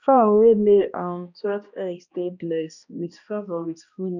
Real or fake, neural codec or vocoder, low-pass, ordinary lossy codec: fake; codec, 16 kHz, 1 kbps, X-Codec, HuBERT features, trained on balanced general audio; 7.2 kHz; none